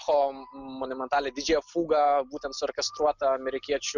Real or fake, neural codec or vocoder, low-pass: real; none; 7.2 kHz